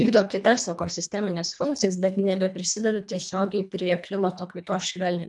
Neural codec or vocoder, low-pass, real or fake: codec, 24 kHz, 1.5 kbps, HILCodec; 10.8 kHz; fake